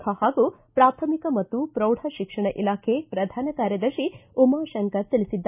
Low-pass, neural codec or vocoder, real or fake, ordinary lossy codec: 3.6 kHz; none; real; none